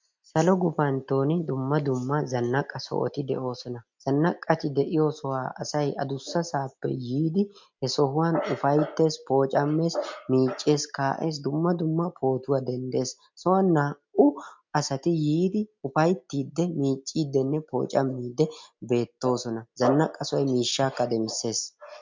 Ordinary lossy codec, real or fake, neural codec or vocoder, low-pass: MP3, 64 kbps; real; none; 7.2 kHz